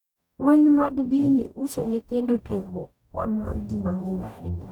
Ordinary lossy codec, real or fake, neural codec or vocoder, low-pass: none; fake; codec, 44.1 kHz, 0.9 kbps, DAC; 19.8 kHz